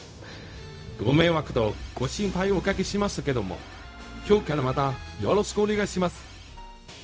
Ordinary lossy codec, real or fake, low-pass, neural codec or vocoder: none; fake; none; codec, 16 kHz, 0.4 kbps, LongCat-Audio-Codec